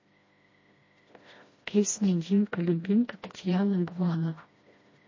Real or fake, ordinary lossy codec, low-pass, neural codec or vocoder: fake; MP3, 32 kbps; 7.2 kHz; codec, 16 kHz, 1 kbps, FreqCodec, smaller model